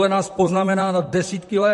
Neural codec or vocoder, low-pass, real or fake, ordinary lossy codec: vocoder, 44.1 kHz, 128 mel bands, Pupu-Vocoder; 14.4 kHz; fake; MP3, 48 kbps